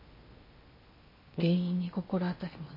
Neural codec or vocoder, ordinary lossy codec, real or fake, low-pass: codec, 16 kHz in and 24 kHz out, 0.8 kbps, FocalCodec, streaming, 65536 codes; MP3, 24 kbps; fake; 5.4 kHz